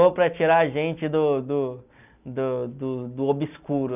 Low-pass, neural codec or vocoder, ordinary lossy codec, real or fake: 3.6 kHz; none; none; real